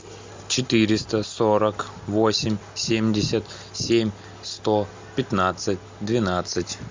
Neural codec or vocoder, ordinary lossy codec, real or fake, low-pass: none; MP3, 64 kbps; real; 7.2 kHz